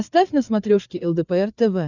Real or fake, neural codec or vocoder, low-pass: fake; codec, 16 kHz, 8 kbps, FreqCodec, larger model; 7.2 kHz